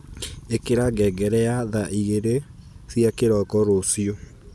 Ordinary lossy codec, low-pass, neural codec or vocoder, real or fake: none; none; none; real